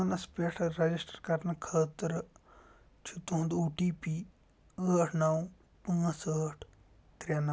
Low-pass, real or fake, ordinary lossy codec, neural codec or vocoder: none; real; none; none